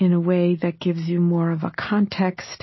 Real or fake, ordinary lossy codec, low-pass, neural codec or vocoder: real; MP3, 24 kbps; 7.2 kHz; none